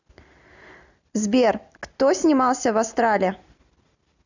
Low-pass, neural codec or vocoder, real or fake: 7.2 kHz; none; real